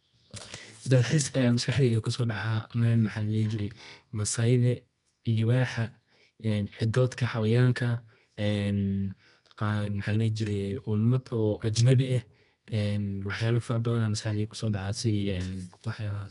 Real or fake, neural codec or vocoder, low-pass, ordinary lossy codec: fake; codec, 24 kHz, 0.9 kbps, WavTokenizer, medium music audio release; 10.8 kHz; none